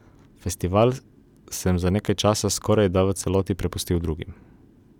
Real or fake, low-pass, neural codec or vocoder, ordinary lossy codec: real; 19.8 kHz; none; none